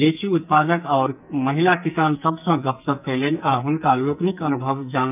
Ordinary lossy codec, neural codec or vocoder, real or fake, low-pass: none; codec, 44.1 kHz, 2.6 kbps, SNAC; fake; 3.6 kHz